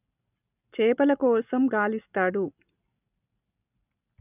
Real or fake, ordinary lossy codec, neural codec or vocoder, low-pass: real; none; none; 3.6 kHz